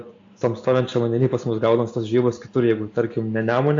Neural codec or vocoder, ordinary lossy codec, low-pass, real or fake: none; AAC, 48 kbps; 7.2 kHz; real